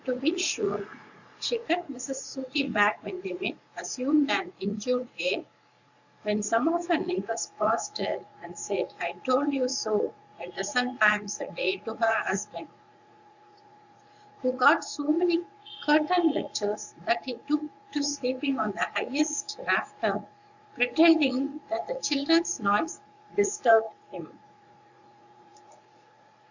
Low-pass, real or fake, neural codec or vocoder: 7.2 kHz; fake; vocoder, 44.1 kHz, 80 mel bands, Vocos